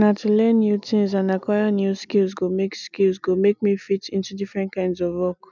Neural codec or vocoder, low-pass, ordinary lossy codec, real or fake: none; 7.2 kHz; none; real